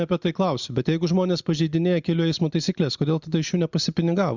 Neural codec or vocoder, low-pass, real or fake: none; 7.2 kHz; real